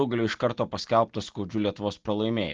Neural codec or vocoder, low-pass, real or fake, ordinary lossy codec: none; 7.2 kHz; real; Opus, 16 kbps